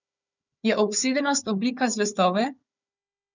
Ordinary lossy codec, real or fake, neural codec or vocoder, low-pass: none; fake; codec, 16 kHz, 4 kbps, FunCodec, trained on Chinese and English, 50 frames a second; 7.2 kHz